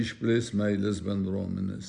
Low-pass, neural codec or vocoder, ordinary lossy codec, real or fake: 10.8 kHz; none; Opus, 64 kbps; real